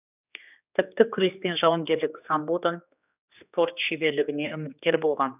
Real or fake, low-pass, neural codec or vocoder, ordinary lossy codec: fake; 3.6 kHz; codec, 16 kHz, 2 kbps, X-Codec, HuBERT features, trained on general audio; none